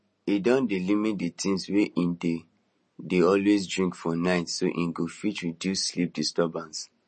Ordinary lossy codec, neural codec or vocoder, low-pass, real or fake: MP3, 32 kbps; none; 10.8 kHz; real